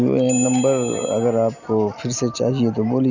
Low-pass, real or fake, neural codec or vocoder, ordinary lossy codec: 7.2 kHz; real; none; none